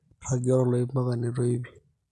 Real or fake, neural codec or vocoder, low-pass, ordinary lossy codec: real; none; none; none